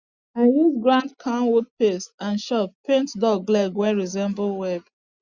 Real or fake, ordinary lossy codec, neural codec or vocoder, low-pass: real; Opus, 64 kbps; none; 7.2 kHz